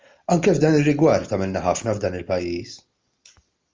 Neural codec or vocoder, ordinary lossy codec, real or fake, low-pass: none; Opus, 32 kbps; real; 7.2 kHz